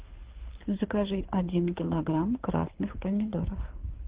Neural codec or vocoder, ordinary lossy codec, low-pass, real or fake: codec, 24 kHz, 6 kbps, HILCodec; Opus, 16 kbps; 3.6 kHz; fake